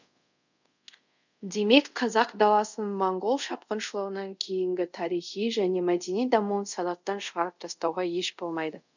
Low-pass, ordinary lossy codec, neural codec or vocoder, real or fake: 7.2 kHz; none; codec, 24 kHz, 0.5 kbps, DualCodec; fake